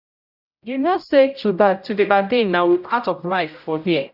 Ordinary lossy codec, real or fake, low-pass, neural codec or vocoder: none; fake; 5.4 kHz; codec, 16 kHz, 0.5 kbps, X-Codec, HuBERT features, trained on general audio